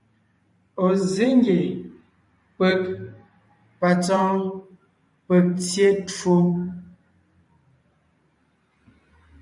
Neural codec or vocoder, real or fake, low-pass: vocoder, 44.1 kHz, 128 mel bands every 512 samples, BigVGAN v2; fake; 10.8 kHz